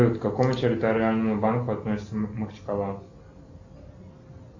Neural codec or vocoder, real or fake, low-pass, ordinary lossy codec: none; real; 7.2 kHz; AAC, 48 kbps